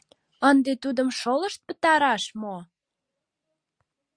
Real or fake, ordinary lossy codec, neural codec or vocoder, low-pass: real; Opus, 64 kbps; none; 9.9 kHz